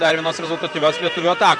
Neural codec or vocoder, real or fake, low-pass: vocoder, 44.1 kHz, 128 mel bands, Pupu-Vocoder; fake; 10.8 kHz